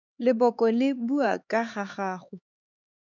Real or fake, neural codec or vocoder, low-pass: fake; codec, 16 kHz, 4 kbps, X-Codec, HuBERT features, trained on LibriSpeech; 7.2 kHz